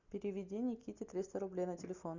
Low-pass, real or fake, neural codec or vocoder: 7.2 kHz; real; none